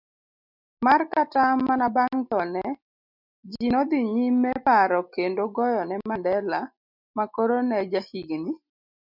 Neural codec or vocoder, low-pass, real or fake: none; 5.4 kHz; real